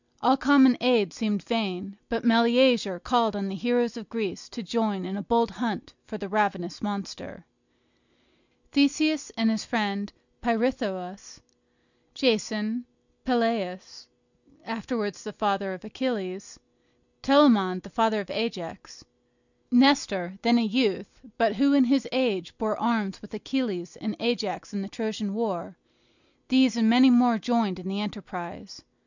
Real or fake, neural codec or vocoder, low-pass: real; none; 7.2 kHz